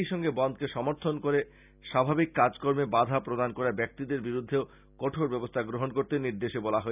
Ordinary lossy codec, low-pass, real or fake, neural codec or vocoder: none; 3.6 kHz; real; none